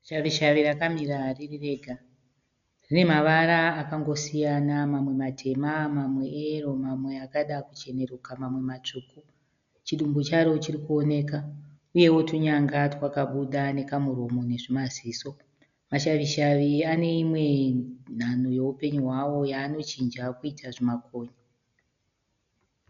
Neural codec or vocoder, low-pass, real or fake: none; 7.2 kHz; real